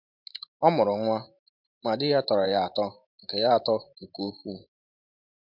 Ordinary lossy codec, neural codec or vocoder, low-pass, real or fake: MP3, 48 kbps; vocoder, 44.1 kHz, 128 mel bands every 512 samples, BigVGAN v2; 5.4 kHz; fake